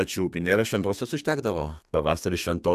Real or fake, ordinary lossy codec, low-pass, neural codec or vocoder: fake; AAC, 96 kbps; 14.4 kHz; codec, 32 kHz, 1.9 kbps, SNAC